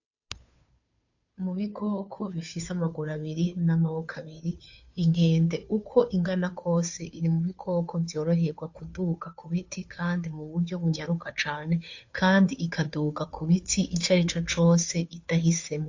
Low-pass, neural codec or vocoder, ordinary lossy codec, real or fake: 7.2 kHz; codec, 16 kHz, 2 kbps, FunCodec, trained on Chinese and English, 25 frames a second; AAC, 48 kbps; fake